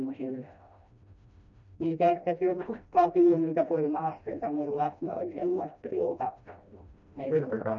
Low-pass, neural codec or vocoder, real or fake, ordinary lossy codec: 7.2 kHz; codec, 16 kHz, 1 kbps, FreqCodec, smaller model; fake; none